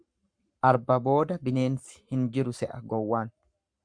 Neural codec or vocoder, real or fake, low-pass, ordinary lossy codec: codec, 44.1 kHz, 7.8 kbps, Pupu-Codec; fake; 9.9 kHz; Opus, 64 kbps